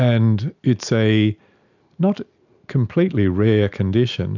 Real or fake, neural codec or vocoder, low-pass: real; none; 7.2 kHz